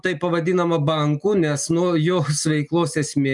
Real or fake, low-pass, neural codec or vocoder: real; 10.8 kHz; none